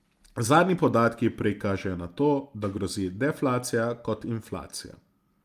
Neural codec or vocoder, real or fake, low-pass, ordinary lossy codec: none; real; 14.4 kHz; Opus, 32 kbps